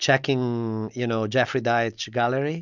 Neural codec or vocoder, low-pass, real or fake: none; 7.2 kHz; real